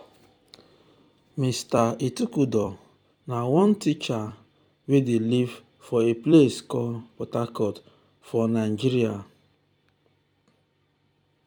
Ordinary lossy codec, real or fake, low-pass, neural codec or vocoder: none; fake; 19.8 kHz; vocoder, 44.1 kHz, 128 mel bands every 256 samples, BigVGAN v2